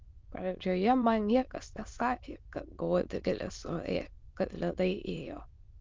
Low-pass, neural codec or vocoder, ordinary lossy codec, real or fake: 7.2 kHz; autoencoder, 22.05 kHz, a latent of 192 numbers a frame, VITS, trained on many speakers; Opus, 32 kbps; fake